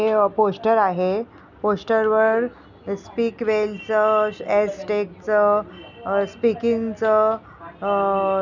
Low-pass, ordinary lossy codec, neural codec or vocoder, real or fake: 7.2 kHz; none; none; real